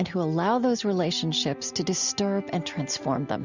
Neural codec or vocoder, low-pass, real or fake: none; 7.2 kHz; real